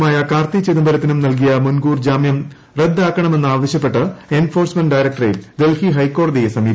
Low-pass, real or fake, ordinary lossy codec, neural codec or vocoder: none; real; none; none